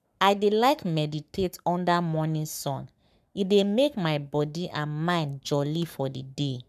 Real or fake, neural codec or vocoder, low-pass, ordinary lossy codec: fake; codec, 44.1 kHz, 7.8 kbps, Pupu-Codec; 14.4 kHz; none